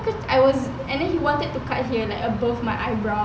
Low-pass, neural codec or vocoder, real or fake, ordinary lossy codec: none; none; real; none